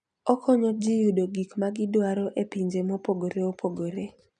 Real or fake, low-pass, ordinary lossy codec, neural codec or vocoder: real; 9.9 kHz; none; none